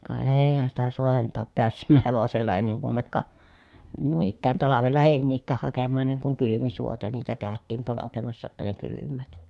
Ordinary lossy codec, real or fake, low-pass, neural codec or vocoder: none; fake; none; codec, 24 kHz, 1 kbps, SNAC